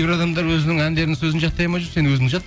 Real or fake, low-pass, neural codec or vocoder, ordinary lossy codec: real; none; none; none